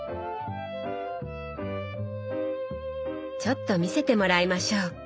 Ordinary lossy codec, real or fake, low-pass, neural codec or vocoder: none; real; none; none